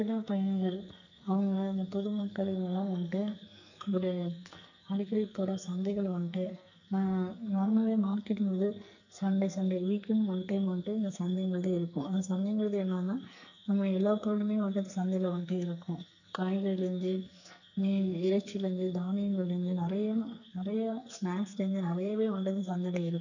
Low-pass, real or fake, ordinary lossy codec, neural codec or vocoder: 7.2 kHz; fake; none; codec, 44.1 kHz, 2.6 kbps, SNAC